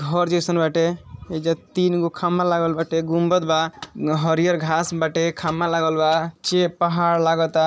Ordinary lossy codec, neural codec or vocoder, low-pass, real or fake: none; none; none; real